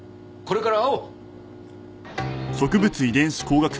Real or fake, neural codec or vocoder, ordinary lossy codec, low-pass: real; none; none; none